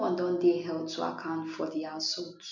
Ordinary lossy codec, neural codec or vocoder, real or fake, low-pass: none; none; real; 7.2 kHz